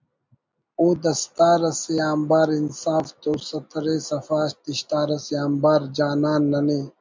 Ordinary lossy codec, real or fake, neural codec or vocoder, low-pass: MP3, 64 kbps; real; none; 7.2 kHz